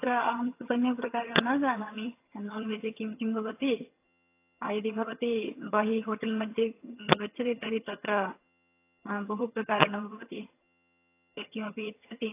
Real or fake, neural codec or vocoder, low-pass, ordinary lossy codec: fake; vocoder, 22.05 kHz, 80 mel bands, HiFi-GAN; 3.6 kHz; AAC, 24 kbps